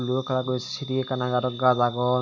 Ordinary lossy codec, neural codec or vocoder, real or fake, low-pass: none; none; real; 7.2 kHz